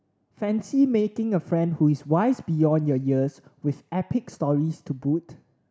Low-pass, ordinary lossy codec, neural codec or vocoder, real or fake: none; none; none; real